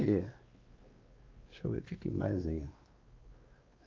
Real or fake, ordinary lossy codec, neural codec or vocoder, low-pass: fake; Opus, 24 kbps; codec, 16 kHz, 2 kbps, X-Codec, WavLM features, trained on Multilingual LibriSpeech; 7.2 kHz